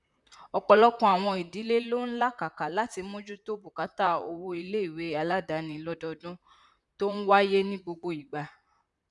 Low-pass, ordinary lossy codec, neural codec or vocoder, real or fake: 10.8 kHz; none; vocoder, 44.1 kHz, 128 mel bands, Pupu-Vocoder; fake